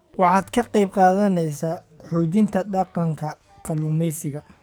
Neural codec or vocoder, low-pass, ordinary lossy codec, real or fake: codec, 44.1 kHz, 2.6 kbps, SNAC; none; none; fake